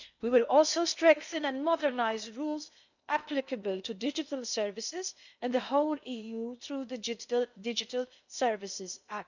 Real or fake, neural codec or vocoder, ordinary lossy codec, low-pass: fake; codec, 16 kHz in and 24 kHz out, 0.8 kbps, FocalCodec, streaming, 65536 codes; none; 7.2 kHz